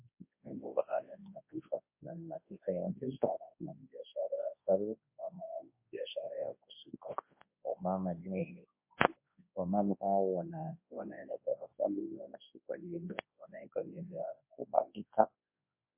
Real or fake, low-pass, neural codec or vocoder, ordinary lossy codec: fake; 3.6 kHz; codec, 24 kHz, 0.9 kbps, WavTokenizer, large speech release; MP3, 24 kbps